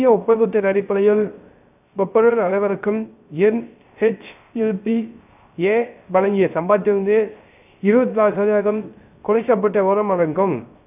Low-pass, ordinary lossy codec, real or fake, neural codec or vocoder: 3.6 kHz; none; fake; codec, 16 kHz, 0.3 kbps, FocalCodec